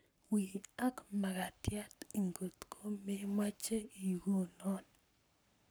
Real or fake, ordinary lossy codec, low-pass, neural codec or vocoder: fake; none; none; codec, 44.1 kHz, 7.8 kbps, Pupu-Codec